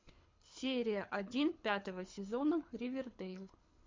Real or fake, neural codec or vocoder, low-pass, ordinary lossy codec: fake; codec, 24 kHz, 6 kbps, HILCodec; 7.2 kHz; MP3, 48 kbps